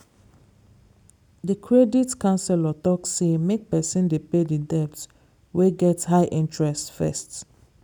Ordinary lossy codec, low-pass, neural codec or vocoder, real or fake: none; 19.8 kHz; none; real